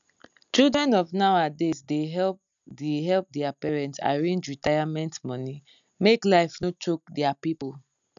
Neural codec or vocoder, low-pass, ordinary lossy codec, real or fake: none; 7.2 kHz; none; real